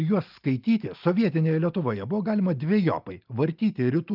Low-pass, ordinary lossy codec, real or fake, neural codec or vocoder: 5.4 kHz; Opus, 32 kbps; real; none